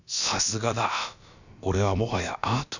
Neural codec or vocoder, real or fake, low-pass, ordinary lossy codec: codec, 16 kHz, about 1 kbps, DyCAST, with the encoder's durations; fake; 7.2 kHz; none